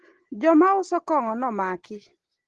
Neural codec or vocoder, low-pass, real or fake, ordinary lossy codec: none; 10.8 kHz; real; Opus, 16 kbps